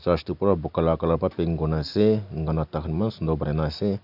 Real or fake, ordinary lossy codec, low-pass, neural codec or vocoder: real; none; 5.4 kHz; none